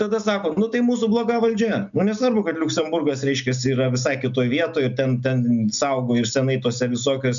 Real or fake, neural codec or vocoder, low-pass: real; none; 7.2 kHz